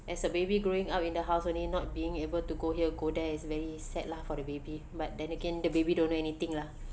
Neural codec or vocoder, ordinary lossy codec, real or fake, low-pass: none; none; real; none